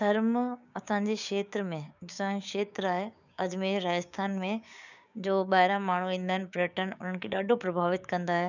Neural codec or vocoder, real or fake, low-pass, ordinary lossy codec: codec, 16 kHz, 6 kbps, DAC; fake; 7.2 kHz; none